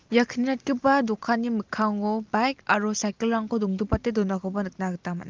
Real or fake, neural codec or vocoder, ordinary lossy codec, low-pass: real; none; Opus, 32 kbps; 7.2 kHz